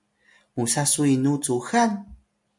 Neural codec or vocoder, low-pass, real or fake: none; 10.8 kHz; real